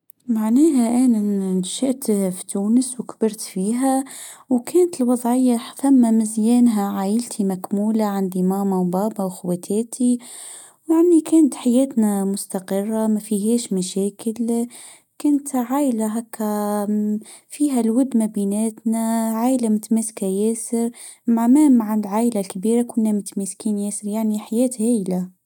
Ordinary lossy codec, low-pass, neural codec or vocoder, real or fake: none; 19.8 kHz; none; real